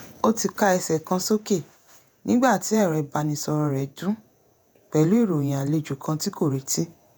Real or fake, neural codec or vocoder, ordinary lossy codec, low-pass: fake; vocoder, 48 kHz, 128 mel bands, Vocos; none; none